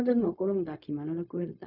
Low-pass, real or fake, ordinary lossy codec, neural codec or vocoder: 5.4 kHz; fake; none; codec, 16 kHz, 0.4 kbps, LongCat-Audio-Codec